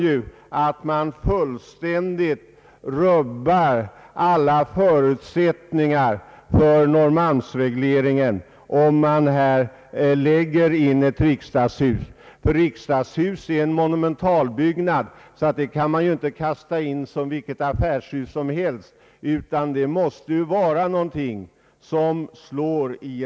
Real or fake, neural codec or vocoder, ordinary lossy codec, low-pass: real; none; none; none